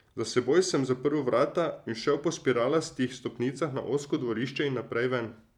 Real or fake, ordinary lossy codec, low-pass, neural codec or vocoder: fake; none; 19.8 kHz; vocoder, 44.1 kHz, 128 mel bands every 256 samples, BigVGAN v2